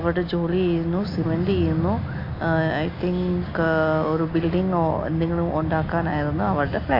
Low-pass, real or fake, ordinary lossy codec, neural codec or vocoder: 5.4 kHz; real; AAC, 32 kbps; none